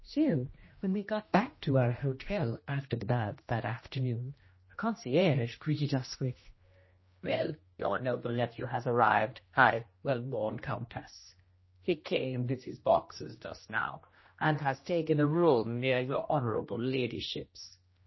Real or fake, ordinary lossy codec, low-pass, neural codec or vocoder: fake; MP3, 24 kbps; 7.2 kHz; codec, 16 kHz, 1 kbps, X-Codec, HuBERT features, trained on general audio